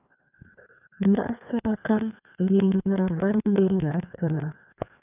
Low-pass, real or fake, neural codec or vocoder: 3.6 kHz; fake; codec, 16 kHz, 2 kbps, FreqCodec, larger model